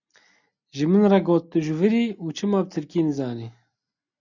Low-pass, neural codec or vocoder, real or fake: 7.2 kHz; none; real